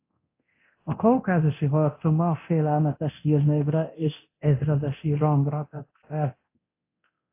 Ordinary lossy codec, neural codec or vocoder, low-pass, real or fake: AAC, 24 kbps; codec, 24 kHz, 0.9 kbps, DualCodec; 3.6 kHz; fake